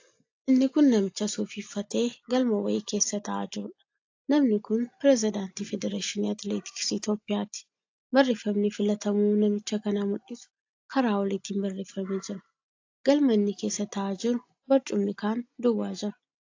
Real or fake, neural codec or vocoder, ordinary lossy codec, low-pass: real; none; AAC, 48 kbps; 7.2 kHz